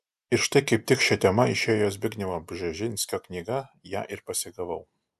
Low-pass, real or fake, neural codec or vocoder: 14.4 kHz; real; none